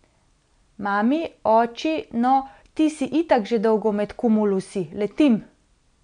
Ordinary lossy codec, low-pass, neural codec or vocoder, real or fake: none; 9.9 kHz; none; real